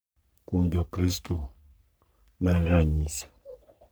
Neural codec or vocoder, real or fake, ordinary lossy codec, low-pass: codec, 44.1 kHz, 3.4 kbps, Pupu-Codec; fake; none; none